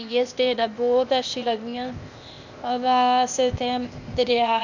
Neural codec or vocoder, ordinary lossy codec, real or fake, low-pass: codec, 16 kHz, 0.8 kbps, ZipCodec; none; fake; 7.2 kHz